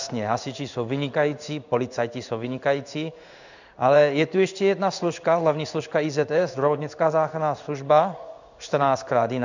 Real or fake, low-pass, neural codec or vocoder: fake; 7.2 kHz; codec, 16 kHz in and 24 kHz out, 1 kbps, XY-Tokenizer